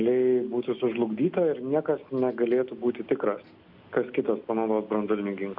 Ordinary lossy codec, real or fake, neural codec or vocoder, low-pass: MP3, 48 kbps; real; none; 5.4 kHz